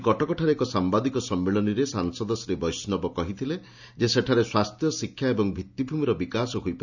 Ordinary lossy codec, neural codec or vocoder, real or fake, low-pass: none; none; real; 7.2 kHz